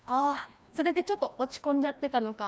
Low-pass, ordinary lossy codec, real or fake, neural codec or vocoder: none; none; fake; codec, 16 kHz, 1 kbps, FreqCodec, larger model